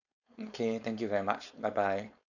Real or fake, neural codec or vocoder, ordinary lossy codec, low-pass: fake; codec, 16 kHz, 4.8 kbps, FACodec; none; 7.2 kHz